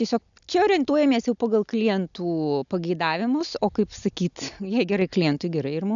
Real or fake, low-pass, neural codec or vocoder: real; 7.2 kHz; none